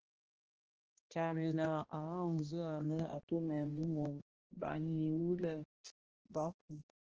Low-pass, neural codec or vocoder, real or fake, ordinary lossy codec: 7.2 kHz; codec, 16 kHz, 1 kbps, X-Codec, HuBERT features, trained on balanced general audio; fake; Opus, 16 kbps